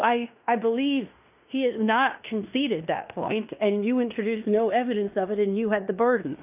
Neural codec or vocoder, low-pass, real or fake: codec, 16 kHz in and 24 kHz out, 0.9 kbps, LongCat-Audio-Codec, fine tuned four codebook decoder; 3.6 kHz; fake